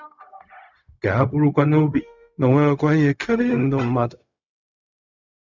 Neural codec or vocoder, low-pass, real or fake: codec, 16 kHz, 0.4 kbps, LongCat-Audio-Codec; 7.2 kHz; fake